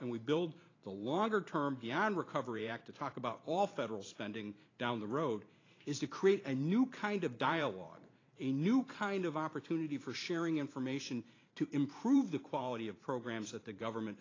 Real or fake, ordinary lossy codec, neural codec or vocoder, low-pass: fake; AAC, 32 kbps; vocoder, 44.1 kHz, 128 mel bands every 512 samples, BigVGAN v2; 7.2 kHz